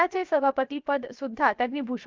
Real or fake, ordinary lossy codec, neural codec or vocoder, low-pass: fake; Opus, 24 kbps; codec, 16 kHz, 0.7 kbps, FocalCodec; 7.2 kHz